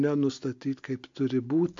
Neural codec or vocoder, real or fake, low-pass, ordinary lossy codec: none; real; 7.2 kHz; MP3, 48 kbps